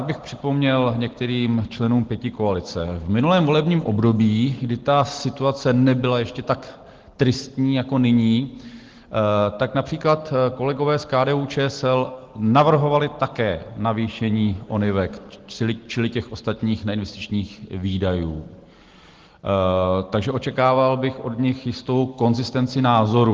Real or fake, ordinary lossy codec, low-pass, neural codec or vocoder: real; Opus, 16 kbps; 7.2 kHz; none